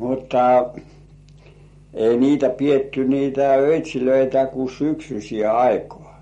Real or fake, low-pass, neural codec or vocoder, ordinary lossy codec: real; 19.8 kHz; none; MP3, 48 kbps